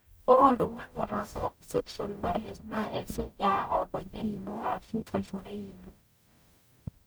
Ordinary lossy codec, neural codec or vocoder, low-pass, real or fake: none; codec, 44.1 kHz, 0.9 kbps, DAC; none; fake